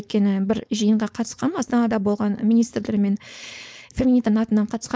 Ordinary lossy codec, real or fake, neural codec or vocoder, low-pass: none; fake; codec, 16 kHz, 4.8 kbps, FACodec; none